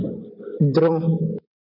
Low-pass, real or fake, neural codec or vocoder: 5.4 kHz; real; none